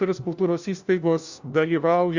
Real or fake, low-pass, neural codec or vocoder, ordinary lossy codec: fake; 7.2 kHz; codec, 16 kHz, 1 kbps, FunCodec, trained on LibriTTS, 50 frames a second; Opus, 64 kbps